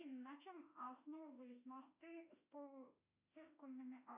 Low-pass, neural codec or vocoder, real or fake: 3.6 kHz; autoencoder, 48 kHz, 32 numbers a frame, DAC-VAE, trained on Japanese speech; fake